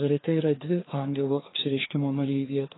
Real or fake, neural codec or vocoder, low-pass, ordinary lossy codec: fake; codec, 16 kHz, 2 kbps, FreqCodec, larger model; 7.2 kHz; AAC, 16 kbps